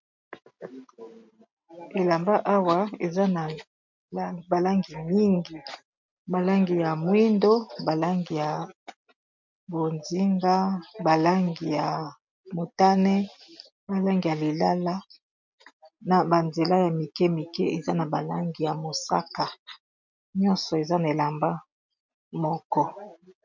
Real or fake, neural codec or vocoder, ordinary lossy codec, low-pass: real; none; MP3, 64 kbps; 7.2 kHz